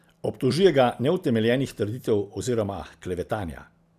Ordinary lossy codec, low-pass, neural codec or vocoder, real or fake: none; 14.4 kHz; none; real